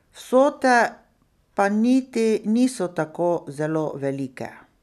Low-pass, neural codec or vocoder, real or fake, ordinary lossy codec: 14.4 kHz; none; real; none